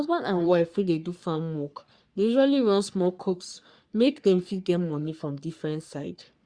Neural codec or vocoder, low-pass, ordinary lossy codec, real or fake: codec, 44.1 kHz, 3.4 kbps, Pupu-Codec; 9.9 kHz; Opus, 64 kbps; fake